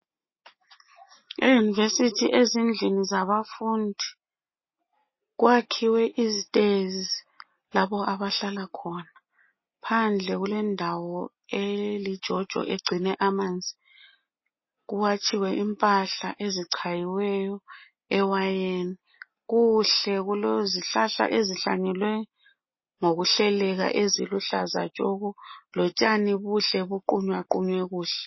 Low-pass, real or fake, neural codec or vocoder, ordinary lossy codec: 7.2 kHz; real; none; MP3, 24 kbps